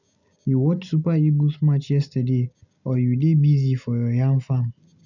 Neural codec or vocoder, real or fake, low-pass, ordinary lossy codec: none; real; 7.2 kHz; none